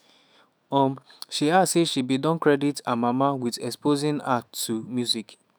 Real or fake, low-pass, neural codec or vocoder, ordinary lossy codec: fake; none; autoencoder, 48 kHz, 128 numbers a frame, DAC-VAE, trained on Japanese speech; none